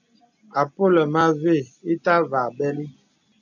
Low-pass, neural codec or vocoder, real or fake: 7.2 kHz; none; real